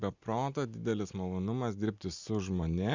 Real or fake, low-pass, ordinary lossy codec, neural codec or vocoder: real; 7.2 kHz; Opus, 64 kbps; none